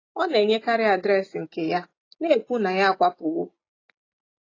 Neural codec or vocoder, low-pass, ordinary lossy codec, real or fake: none; 7.2 kHz; AAC, 32 kbps; real